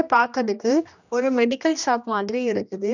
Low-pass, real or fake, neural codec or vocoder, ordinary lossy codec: 7.2 kHz; fake; codec, 16 kHz, 1 kbps, X-Codec, HuBERT features, trained on general audio; none